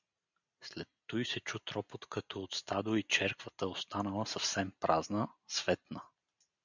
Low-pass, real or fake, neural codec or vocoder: 7.2 kHz; real; none